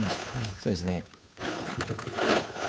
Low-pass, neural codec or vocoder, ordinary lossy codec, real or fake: none; codec, 16 kHz, 2 kbps, FunCodec, trained on Chinese and English, 25 frames a second; none; fake